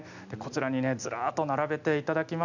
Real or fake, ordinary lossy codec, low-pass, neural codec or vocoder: real; none; 7.2 kHz; none